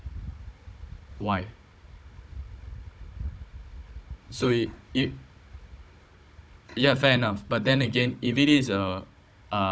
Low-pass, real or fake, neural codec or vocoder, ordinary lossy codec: none; fake; codec, 16 kHz, 16 kbps, FunCodec, trained on Chinese and English, 50 frames a second; none